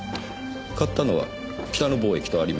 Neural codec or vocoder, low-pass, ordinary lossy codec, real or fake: none; none; none; real